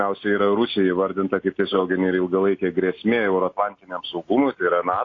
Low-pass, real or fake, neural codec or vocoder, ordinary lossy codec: 7.2 kHz; real; none; AAC, 32 kbps